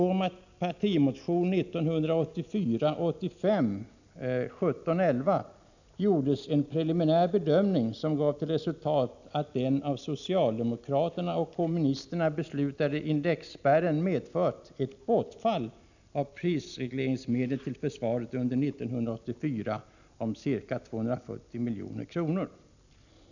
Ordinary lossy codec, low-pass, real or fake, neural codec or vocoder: none; 7.2 kHz; real; none